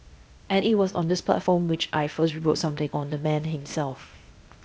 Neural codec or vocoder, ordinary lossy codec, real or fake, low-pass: codec, 16 kHz, 0.8 kbps, ZipCodec; none; fake; none